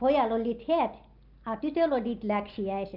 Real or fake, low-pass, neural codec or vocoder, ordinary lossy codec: real; 5.4 kHz; none; Opus, 32 kbps